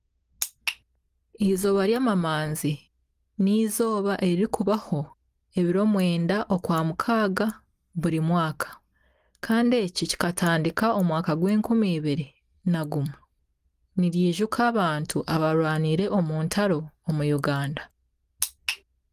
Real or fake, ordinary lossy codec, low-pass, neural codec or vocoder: real; Opus, 24 kbps; 14.4 kHz; none